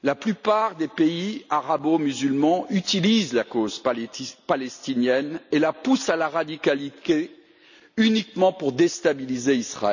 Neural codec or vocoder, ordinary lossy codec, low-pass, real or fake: none; none; 7.2 kHz; real